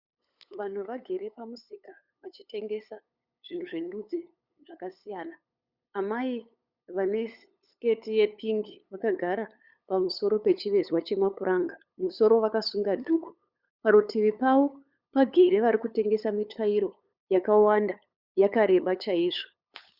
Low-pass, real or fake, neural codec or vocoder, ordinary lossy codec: 5.4 kHz; fake; codec, 16 kHz, 8 kbps, FunCodec, trained on LibriTTS, 25 frames a second; Opus, 64 kbps